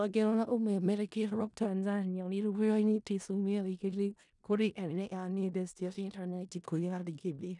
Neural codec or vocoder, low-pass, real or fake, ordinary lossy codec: codec, 16 kHz in and 24 kHz out, 0.4 kbps, LongCat-Audio-Codec, four codebook decoder; 10.8 kHz; fake; none